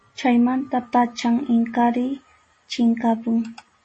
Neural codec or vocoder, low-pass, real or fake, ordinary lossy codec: none; 10.8 kHz; real; MP3, 32 kbps